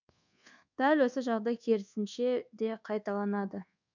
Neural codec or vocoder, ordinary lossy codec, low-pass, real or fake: codec, 24 kHz, 1.2 kbps, DualCodec; none; 7.2 kHz; fake